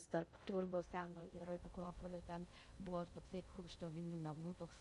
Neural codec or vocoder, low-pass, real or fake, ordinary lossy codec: codec, 16 kHz in and 24 kHz out, 0.6 kbps, FocalCodec, streaming, 2048 codes; 10.8 kHz; fake; MP3, 48 kbps